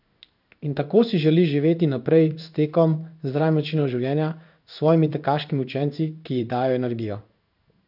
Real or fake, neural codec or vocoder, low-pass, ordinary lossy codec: fake; codec, 16 kHz in and 24 kHz out, 1 kbps, XY-Tokenizer; 5.4 kHz; none